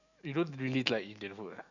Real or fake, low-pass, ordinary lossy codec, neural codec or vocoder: fake; 7.2 kHz; Opus, 64 kbps; autoencoder, 48 kHz, 128 numbers a frame, DAC-VAE, trained on Japanese speech